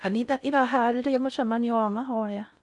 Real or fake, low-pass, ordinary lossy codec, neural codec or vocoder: fake; 10.8 kHz; none; codec, 16 kHz in and 24 kHz out, 0.6 kbps, FocalCodec, streaming, 4096 codes